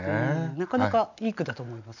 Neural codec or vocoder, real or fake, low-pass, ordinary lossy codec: none; real; 7.2 kHz; none